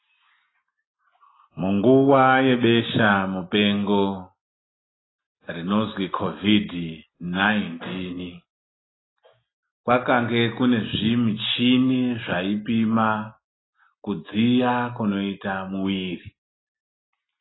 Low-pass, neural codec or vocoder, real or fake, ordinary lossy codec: 7.2 kHz; none; real; AAC, 16 kbps